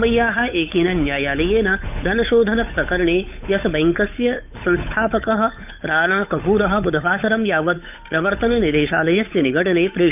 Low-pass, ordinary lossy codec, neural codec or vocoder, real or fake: 3.6 kHz; none; codec, 16 kHz, 8 kbps, FunCodec, trained on Chinese and English, 25 frames a second; fake